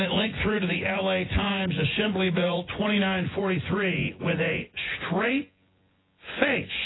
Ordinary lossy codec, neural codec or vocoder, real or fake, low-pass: AAC, 16 kbps; vocoder, 24 kHz, 100 mel bands, Vocos; fake; 7.2 kHz